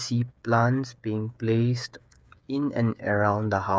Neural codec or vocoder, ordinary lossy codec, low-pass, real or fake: codec, 16 kHz, 8 kbps, FreqCodec, smaller model; none; none; fake